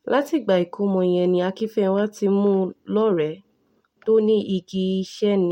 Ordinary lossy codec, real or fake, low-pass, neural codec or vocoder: MP3, 64 kbps; real; 19.8 kHz; none